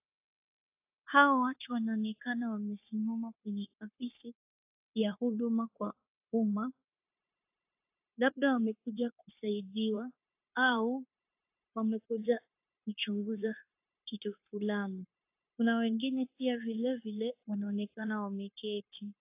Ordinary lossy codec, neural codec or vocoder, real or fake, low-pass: AAC, 32 kbps; codec, 16 kHz, 0.9 kbps, LongCat-Audio-Codec; fake; 3.6 kHz